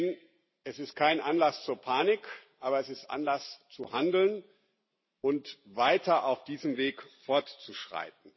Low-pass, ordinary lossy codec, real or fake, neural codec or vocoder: 7.2 kHz; MP3, 24 kbps; real; none